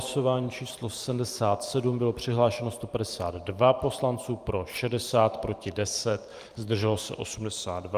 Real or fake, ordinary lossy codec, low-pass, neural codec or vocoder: fake; Opus, 32 kbps; 14.4 kHz; vocoder, 44.1 kHz, 128 mel bands every 512 samples, BigVGAN v2